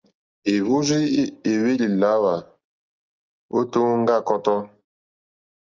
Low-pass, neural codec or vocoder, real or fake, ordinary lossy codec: 7.2 kHz; none; real; Opus, 32 kbps